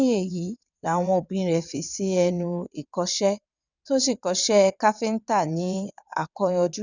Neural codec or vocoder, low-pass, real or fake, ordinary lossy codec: vocoder, 22.05 kHz, 80 mel bands, WaveNeXt; 7.2 kHz; fake; none